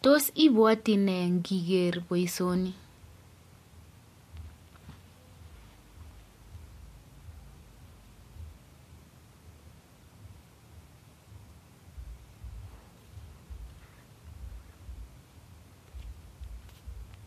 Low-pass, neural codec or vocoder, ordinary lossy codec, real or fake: 14.4 kHz; none; MP3, 64 kbps; real